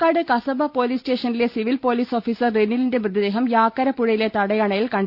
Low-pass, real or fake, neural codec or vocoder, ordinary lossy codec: 5.4 kHz; real; none; Opus, 64 kbps